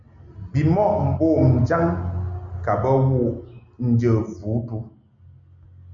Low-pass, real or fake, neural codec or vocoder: 7.2 kHz; real; none